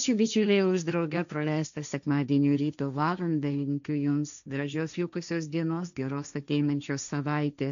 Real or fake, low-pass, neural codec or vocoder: fake; 7.2 kHz; codec, 16 kHz, 1.1 kbps, Voila-Tokenizer